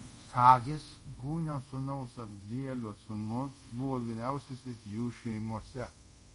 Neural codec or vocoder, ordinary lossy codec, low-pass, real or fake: codec, 24 kHz, 0.5 kbps, DualCodec; MP3, 48 kbps; 10.8 kHz; fake